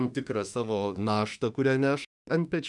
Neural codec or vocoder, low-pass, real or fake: autoencoder, 48 kHz, 32 numbers a frame, DAC-VAE, trained on Japanese speech; 10.8 kHz; fake